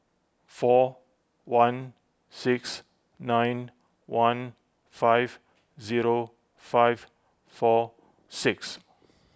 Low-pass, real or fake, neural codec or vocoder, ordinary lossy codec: none; real; none; none